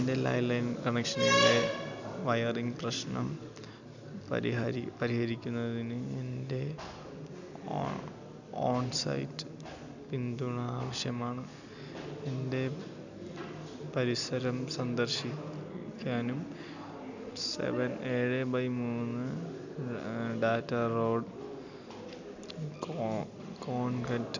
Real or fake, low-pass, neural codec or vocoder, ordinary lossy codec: real; 7.2 kHz; none; none